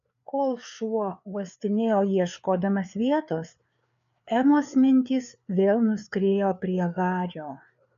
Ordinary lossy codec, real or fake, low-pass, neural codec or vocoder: AAC, 96 kbps; fake; 7.2 kHz; codec, 16 kHz, 4 kbps, FreqCodec, larger model